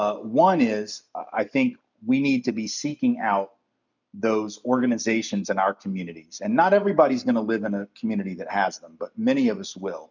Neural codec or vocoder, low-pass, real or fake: none; 7.2 kHz; real